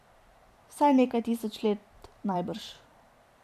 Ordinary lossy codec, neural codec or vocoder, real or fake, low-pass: none; vocoder, 44.1 kHz, 128 mel bands every 512 samples, BigVGAN v2; fake; 14.4 kHz